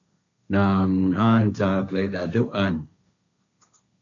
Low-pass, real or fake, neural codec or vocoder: 7.2 kHz; fake; codec, 16 kHz, 1.1 kbps, Voila-Tokenizer